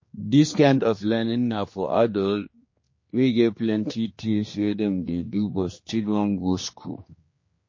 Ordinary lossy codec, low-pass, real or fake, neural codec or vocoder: MP3, 32 kbps; 7.2 kHz; fake; codec, 16 kHz, 2 kbps, X-Codec, HuBERT features, trained on general audio